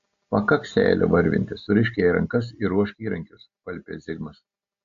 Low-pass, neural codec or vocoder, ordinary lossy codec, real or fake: 7.2 kHz; none; AAC, 48 kbps; real